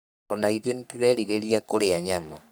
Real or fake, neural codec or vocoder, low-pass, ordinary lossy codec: fake; codec, 44.1 kHz, 3.4 kbps, Pupu-Codec; none; none